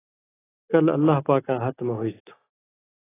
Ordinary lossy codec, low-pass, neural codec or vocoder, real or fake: AAC, 16 kbps; 3.6 kHz; none; real